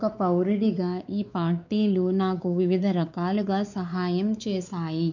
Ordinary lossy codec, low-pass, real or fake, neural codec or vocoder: none; 7.2 kHz; fake; codec, 16 kHz, 4 kbps, X-Codec, WavLM features, trained on Multilingual LibriSpeech